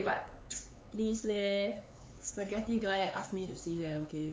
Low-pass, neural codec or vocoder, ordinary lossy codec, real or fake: none; codec, 16 kHz, 4 kbps, X-Codec, HuBERT features, trained on LibriSpeech; none; fake